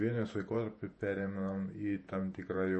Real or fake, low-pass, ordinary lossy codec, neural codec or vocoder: fake; 10.8 kHz; MP3, 32 kbps; vocoder, 48 kHz, 128 mel bands, Vocos